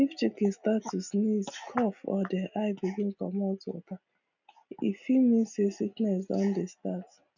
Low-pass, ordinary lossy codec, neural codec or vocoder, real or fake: 7.2 kHz; none; none; real